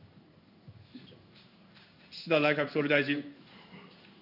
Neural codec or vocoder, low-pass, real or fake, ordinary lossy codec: codec, 16 kHz in and 24 kHz out, 1 kbps, XY-Tokenizer; 5.4 kHz; fake; none